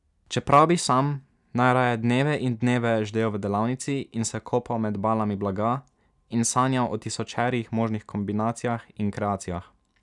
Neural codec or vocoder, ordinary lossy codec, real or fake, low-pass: none; none; real; 10.8 kHz